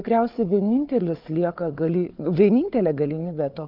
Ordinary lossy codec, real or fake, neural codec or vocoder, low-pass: Opus, 24 kbps; fake; codec, 16 kHz, 4 kbps, FunCodec, trained on Chinese and English, 50 frames a second; 5.4 kHz